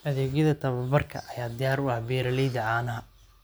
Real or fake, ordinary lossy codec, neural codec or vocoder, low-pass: real; none; none; none